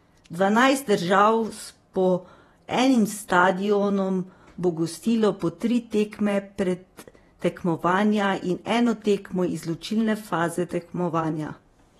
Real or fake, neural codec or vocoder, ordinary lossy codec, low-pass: fake; vocoder, 48 kHz, 128 mel bands, Vocos; AAC, 32 kbps; 19.8 kHz